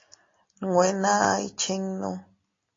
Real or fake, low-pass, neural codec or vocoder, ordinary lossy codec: real; 7.2 kHz; none; AAC, 32 kbps